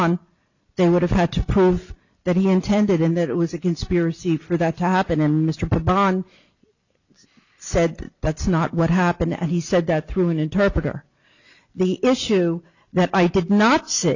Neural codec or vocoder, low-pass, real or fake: none; 7.2 kHz; real